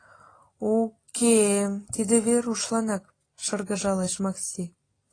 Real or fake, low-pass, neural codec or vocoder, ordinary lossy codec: real; 9.9 kHz; none; AAC, 32 kbps